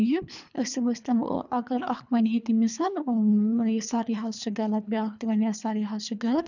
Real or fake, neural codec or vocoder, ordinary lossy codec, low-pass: fake; codec, 24 kHz, 3 kbps, HILCodec; none; 7.2 kHz